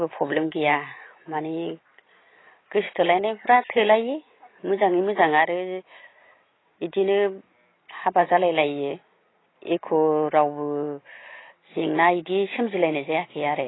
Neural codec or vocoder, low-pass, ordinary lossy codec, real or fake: none; 7.2 kHz; AAC, 16 kbps; real